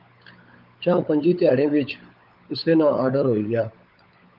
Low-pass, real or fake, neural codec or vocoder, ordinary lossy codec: 5.4 kHz; fake; codec, 16 kHz, 16 kbps, FunCodec, trained on LibriTTS, 50 frames a second; Opus, 24 kbps